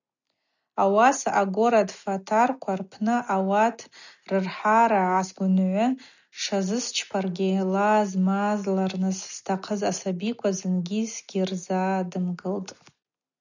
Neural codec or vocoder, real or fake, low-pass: none; real; 7.2 kHz